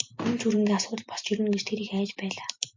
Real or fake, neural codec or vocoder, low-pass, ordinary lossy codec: real; none; 7.2 kHz; MP3, 48 kbps